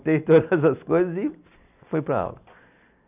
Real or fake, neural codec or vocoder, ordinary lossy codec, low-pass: real; none; none; 3.6 kHz